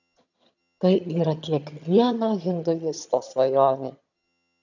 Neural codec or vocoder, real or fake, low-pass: vocoder, 22.05 kHz, 80 mel bands, HiFi-GAN; fake; 7.2 kHz